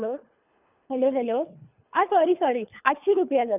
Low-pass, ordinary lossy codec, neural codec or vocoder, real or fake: 3.6 kHz; none; codec, 24 kHz, 3 kbps, HILCodec; fake